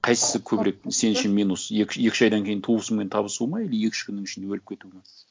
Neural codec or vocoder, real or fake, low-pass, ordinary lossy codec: none; real; none; none